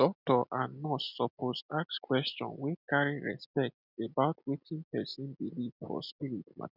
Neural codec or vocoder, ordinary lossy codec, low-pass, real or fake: none; none; 5.4 kHz; real